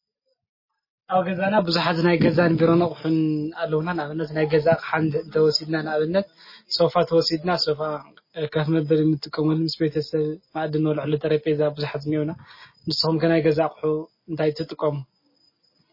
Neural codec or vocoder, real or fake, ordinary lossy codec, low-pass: none; real; MP3, 24 kbps; 5.4 kHz